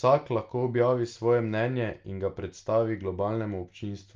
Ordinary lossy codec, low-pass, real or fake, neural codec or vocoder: Opus, 24 kbps; 7.2 kHz; real; none